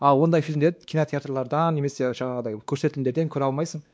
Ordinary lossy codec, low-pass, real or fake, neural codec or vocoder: none; none; fake; codec, 16 kHz, 2 kbps, X-Codec, WavLM features, trained on Multilingual LibriSpeech